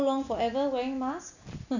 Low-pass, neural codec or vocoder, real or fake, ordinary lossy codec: 7.2 kHz; vocoder, 44.1 kHz, 128 mel bands every 256 samples, BigVGAN v2; fake; none